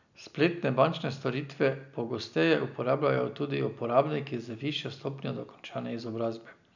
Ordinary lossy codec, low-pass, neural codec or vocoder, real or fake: none; 7.2 kHz; none; real